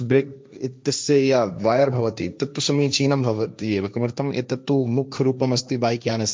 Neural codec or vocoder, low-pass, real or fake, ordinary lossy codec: codec, 16 kHz, 1.1 kbps, Voila-Tokenizer; none; fake; none